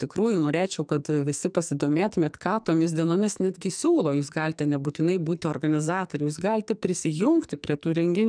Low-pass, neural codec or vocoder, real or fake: 9.9 kHz; codec, 44.1 kHz, 2.6 kbps, SNAC; fake